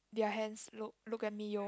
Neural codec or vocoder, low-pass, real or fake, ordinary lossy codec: none; none; real; none